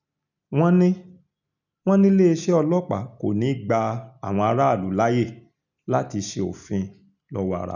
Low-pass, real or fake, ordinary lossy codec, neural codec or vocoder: 7.2 kHz; real; none; none